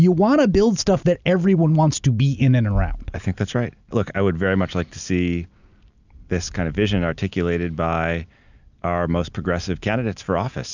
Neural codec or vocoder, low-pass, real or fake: none; 7.2 kHz; real